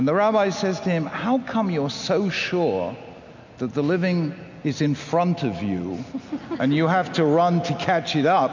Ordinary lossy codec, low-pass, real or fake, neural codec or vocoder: MP3, 64 kbps; 7.2 kHz; real; none